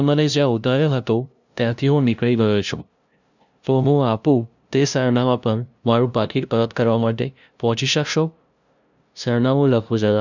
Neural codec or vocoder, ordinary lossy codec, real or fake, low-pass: codec, 16 kHz, 0.5 kbps, FunCodec, trained on LibriTTS, 25 frames a second; none; fake; 7.2 kHz